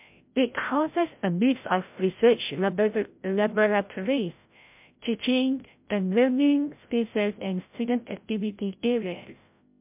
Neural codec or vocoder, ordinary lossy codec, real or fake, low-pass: codec, 16 kHz, 0.5 kbps, FreqCodec, larger model; MP3, 32 kbps; fake; 3.6 kHz